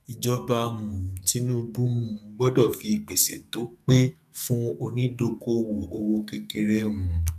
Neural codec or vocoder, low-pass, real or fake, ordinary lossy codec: codec, 44.1 kHz, 2.6 kbps, SNAC; 14.4 kHz; fake; none